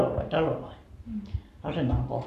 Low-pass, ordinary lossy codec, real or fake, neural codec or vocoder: 14.4 kHz; none; fake; codec, 44.1 kHz, 7.8 kbps, Pupu-Codec